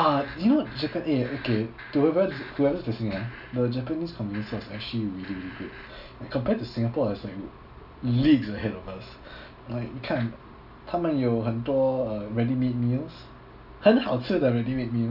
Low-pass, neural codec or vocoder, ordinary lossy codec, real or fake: 5.4 kHz; none; none; real